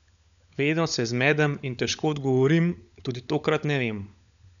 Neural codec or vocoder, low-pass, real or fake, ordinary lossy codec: codec, 16 kHz, 16 kbps, FunCodec, trained on LibriTTS, 50 frames a second; 7.2 kHz; fake; none